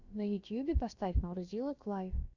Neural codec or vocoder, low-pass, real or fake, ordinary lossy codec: codec, 16 kHz, about 1 kbps, DyCAST, with the encoder's durations; 7.2 kHz; fake; Opus, 64 kbps